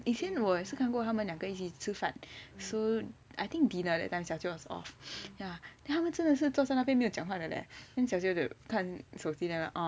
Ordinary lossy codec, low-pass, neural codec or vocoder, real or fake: none; none; none; real